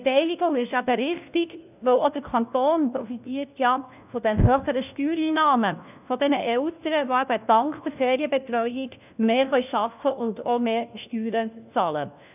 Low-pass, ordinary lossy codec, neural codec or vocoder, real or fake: 3.6 kHz; none; codec, 16 kHz, 1 kbps, FunCodec, trained on LibriTTS, 50 frames a second; fake